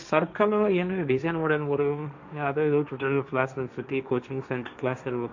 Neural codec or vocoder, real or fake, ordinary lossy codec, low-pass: codec, 16 kHz, 1.1 kbps, Voila-Tokenizer; fake; MP3, 64 kbps; 7.2 kHz